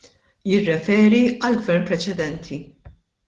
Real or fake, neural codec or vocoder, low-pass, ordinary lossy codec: real; none; 10.8 kHz; Opus, 16 kbps